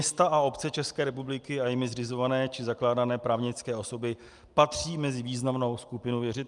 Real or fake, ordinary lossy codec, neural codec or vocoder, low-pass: real; Opus, 32 kbps; none; 10.8 kHz